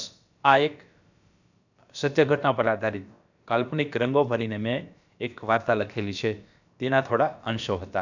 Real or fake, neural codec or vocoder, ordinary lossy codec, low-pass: fake; codec, 16 kHz, about 1 kbps, DyCAST, with the encoder's durations; none; 7.2 kHz